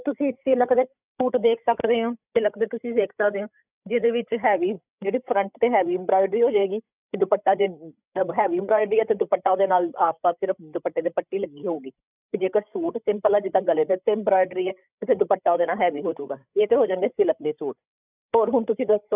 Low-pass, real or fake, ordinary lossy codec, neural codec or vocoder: 3.6 kHz; fake; none; codec, 16 kHz, 8 kbps, FreqCodec, larger model